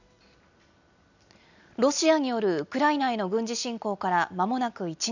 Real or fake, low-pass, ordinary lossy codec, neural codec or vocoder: real; 7.2 kHz; none; none